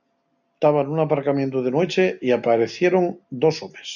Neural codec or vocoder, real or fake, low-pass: none; real; 7.2 kHz